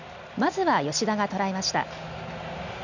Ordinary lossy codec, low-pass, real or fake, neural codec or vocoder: none; 7.2 kHz; real; none